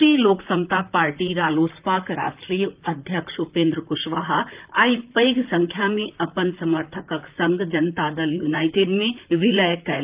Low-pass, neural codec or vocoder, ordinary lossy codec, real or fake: 3.6 kHz; vocoder, 44.1 kHz, 128 mel bands, Pupu-Vocoder; Opus, 24 kbps; fake